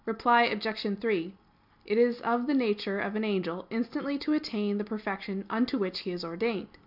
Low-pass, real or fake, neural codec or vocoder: 5.4 kHz; real; none